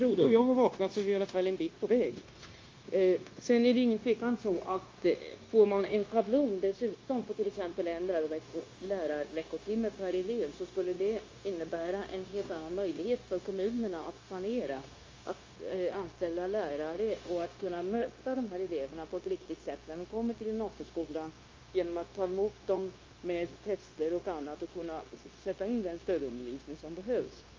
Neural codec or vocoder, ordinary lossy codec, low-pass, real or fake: codec, 16 kHz, 0.9 kbps, LongCat-Audio-Codec; Opus, 32 kbps; 7.2 kHz; fake